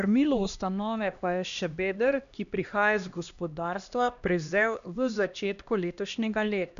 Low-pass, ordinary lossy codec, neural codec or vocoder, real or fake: 7.2 kHz; none; codec, 16 kHz, 1 kbps, X-Codec, HuBERT features, trained on LibriSpeech; fake